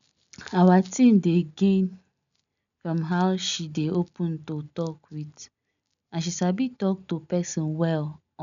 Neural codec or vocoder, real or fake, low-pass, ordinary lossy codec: none; real; 7.2 kHz; none